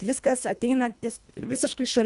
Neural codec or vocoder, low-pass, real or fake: codec, 24 kHz, 1.5 kbps, HILCodec; 10.8 kHz; fake